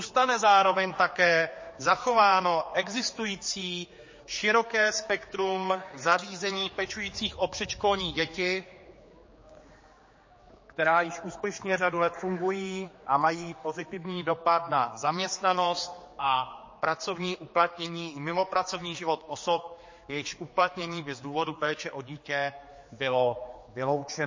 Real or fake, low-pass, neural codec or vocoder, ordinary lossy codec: fake; 7.2 kHz; codec, 16 kHz, 4 kbps, X-Codec, HuBERT features, trained on general audio; MP3, 32 kbps